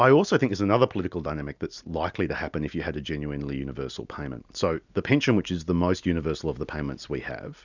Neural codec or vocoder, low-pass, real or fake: none; 7.2 kHz; real